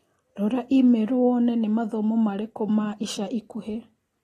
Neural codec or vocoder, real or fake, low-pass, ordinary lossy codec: none; real; 19.8 kHz; AAC, 32 kbps